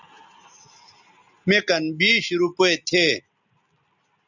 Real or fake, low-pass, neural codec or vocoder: real; 7.2 kHz; none